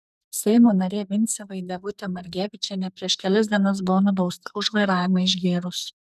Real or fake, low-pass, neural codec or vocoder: fake; 14.4 kHz; codec, 44.1 kHz, 2.6 kbps, SNAC